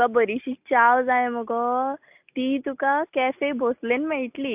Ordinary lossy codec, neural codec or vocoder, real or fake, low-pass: AAC, 32 kbps; none; real; 3.6 kHz